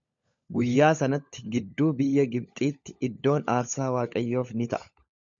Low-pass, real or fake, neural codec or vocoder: 7.2 kHz; fake; codec, 16 kHz, 16 kbps, FunCodec, trained on LibriTTS, 50 frames a second